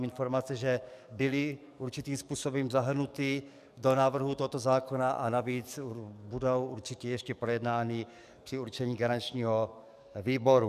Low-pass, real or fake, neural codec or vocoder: 14.4 kHz; fake; codec, 44.1 kHz, 7.8 kbps, DAC